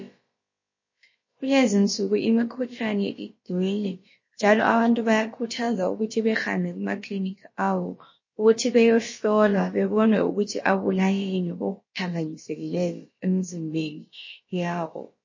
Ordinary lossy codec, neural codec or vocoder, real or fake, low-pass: MP3, 32 kbps; codec, 16 kHz, about 1 kbps, DyCAST, with the encoder's durations; fake; 7.2 kHz